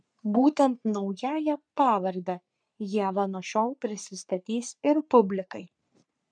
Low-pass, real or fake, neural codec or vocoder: 9.9 kHz; fake; codec, 44.1 kHz, 3.4 kbps, Pupu-Codec